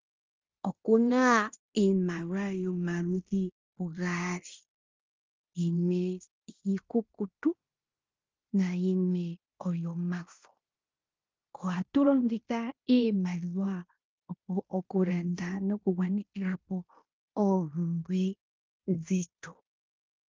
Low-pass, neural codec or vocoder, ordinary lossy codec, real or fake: 7.2 kHz; codec, 16 kHz in and 24 kHz out, 0.9 kbps, LongCat-Audio-Codec, fine tuned four codebook decoder; Opus, 32 kbps; fake